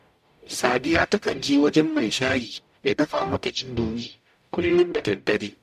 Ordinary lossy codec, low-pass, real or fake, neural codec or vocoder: MP3, 96 kbps; 14.4 kHz; fake; codec, 44.1 kHz, 0.9 kbps, DAC